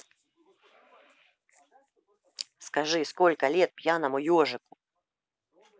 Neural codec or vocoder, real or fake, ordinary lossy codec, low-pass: none; real; none; none